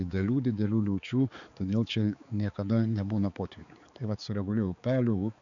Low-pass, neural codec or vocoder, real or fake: 7.2 kHz; codec, 16 kHz, 4 kbps, X-Codec, HuBERT features, trained on balanced general audio; fake